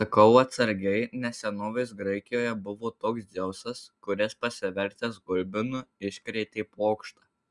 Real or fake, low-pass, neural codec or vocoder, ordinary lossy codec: real; 10.8 kHz; none; Opus, 64 kbps